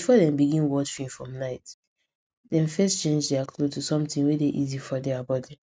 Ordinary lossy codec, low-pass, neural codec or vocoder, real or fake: none; none; none; real